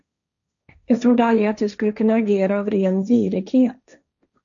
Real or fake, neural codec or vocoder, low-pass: fake; codec, 16 kHz, 1.1 kbps, Voila-Tokenizer; 7.2 kHz